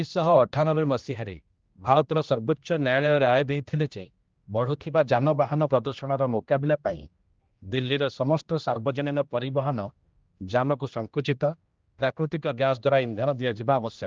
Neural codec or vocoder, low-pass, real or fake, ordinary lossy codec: codec, 16 kHz, 1 kbps, X-Codec, HuBERT features, trained on general audio; 7.2 kHz; fake; Opus, 24 kbps